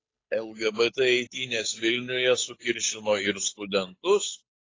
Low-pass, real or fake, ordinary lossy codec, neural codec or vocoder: 7.2 kHz; fake; AAC, 32 kbps; codec, 16 kHz, 8 kbps, FunCodec, trained on Chinese and English, 25 frames a second